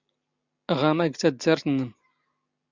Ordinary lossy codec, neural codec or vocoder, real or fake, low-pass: Opus, 64 kbps; none; real; 7.2 kHz